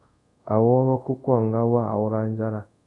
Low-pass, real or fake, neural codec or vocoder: 10.8 kHz; fake; codec, 24 kHz, 0.5 kbps, DualCodec